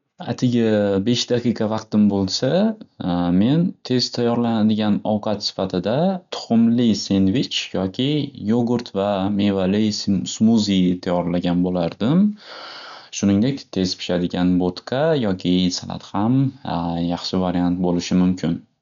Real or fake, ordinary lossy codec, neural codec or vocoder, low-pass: real; none; none; 7.2 kHz